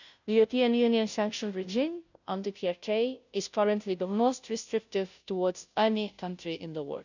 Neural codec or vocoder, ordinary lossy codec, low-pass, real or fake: codec, 16 kHz, 0.5 kbps, FunCodec, trained on Chinese and English, 25 frames a second; none; 7.2 kHz; fake